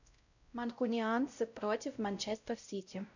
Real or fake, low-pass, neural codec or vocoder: fake; 7.2 kHz; codec, 16 kHz, 0.5 kbps, X-Codec, WavLM features, trained on Multilingual LibriSpeech